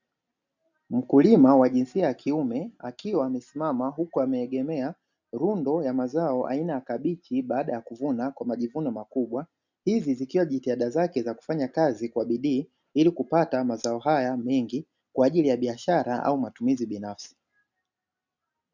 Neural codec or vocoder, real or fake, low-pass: none; real; 7.2 kHz